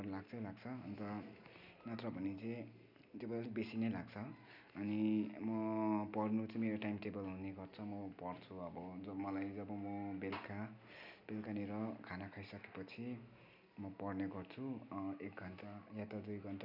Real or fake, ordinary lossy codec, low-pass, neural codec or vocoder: real; none; 5.4 kHz; none